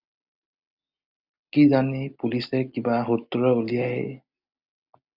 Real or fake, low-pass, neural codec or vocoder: real; 5.4 kHz; none